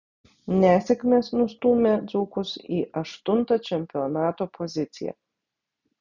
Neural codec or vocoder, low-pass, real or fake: none; 7.2 kHz; real